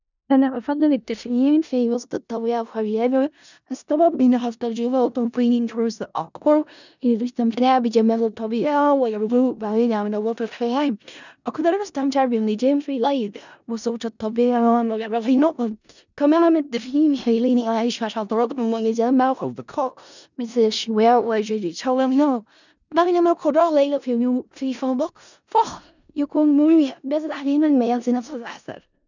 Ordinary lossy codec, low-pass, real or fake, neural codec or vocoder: none; 7.2 kHz; fake; codec, 16 kHz in and 24 kHz out, 0.4 kbps, LongCat-Audio-Codec, four codebook decoder